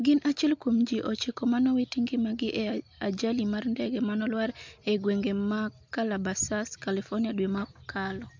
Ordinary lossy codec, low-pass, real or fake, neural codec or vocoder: MP3, 64 kbps; 7.2 kHz; real; none